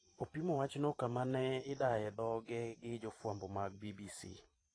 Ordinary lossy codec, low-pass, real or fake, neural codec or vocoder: AAC, 32 kbps; 9.9 kHz; fake; vocoder, 44.1 kHz, 128 mel bands every 512 samples, BigVGAN v2